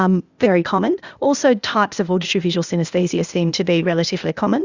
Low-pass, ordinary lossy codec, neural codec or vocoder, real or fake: 7.2 kHz; Opus, 64 kbps; codec, 16 kHz, 0.8 kbps, ZipCodec; fake